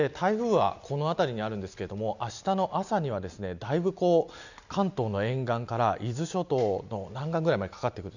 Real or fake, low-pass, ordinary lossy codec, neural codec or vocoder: real; 7.2 kHz; none; none